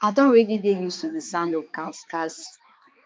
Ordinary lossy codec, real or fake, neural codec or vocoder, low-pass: none; fake; codec, 16 kHz, 2 kbps, X-Codec, HuBERT features, trained on balanced general audio; none